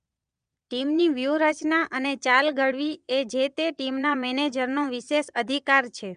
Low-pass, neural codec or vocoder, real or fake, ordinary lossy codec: 9.9 kHz; vocoder, 22.05 kHz, 80 mel bands, Vocos; fake; none